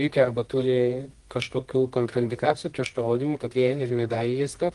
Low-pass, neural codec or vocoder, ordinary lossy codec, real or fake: 10.8 kHz; codec, 24 kHz, 0.9 kbps, WavTokenizer, medium music audio release; Opus, 24 kbps; fake